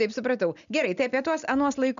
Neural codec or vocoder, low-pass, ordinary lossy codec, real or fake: none; 7.2 kHz; MP3, 96 kbps; real